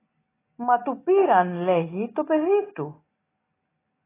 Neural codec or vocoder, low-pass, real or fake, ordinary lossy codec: none; 3.6 kHz; real; AAC, 16 kbps